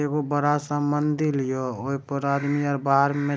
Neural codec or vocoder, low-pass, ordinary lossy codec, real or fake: none; none; none; real